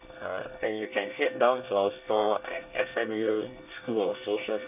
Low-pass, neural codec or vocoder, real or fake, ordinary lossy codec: 3.6 kHz; codec, 24 kHz, 1 kbps, SNAC; fake; none